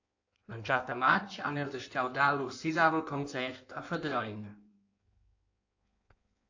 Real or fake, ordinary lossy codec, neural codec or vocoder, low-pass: fake; AAC, 48 kbps; codec, 16 kHz in and 24 kHz out, 1.1 kbps, FireRedTTS-2 codec; 7.2 kHz